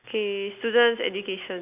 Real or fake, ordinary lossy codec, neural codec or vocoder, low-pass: real; none; none; 3.6 kHz